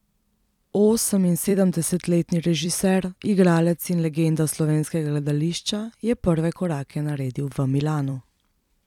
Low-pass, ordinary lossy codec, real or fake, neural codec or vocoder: 19.8 kHz; none; fake; vocoder, 44.1 kHz, 128 mel bands every 256 samples, BigVGAN v2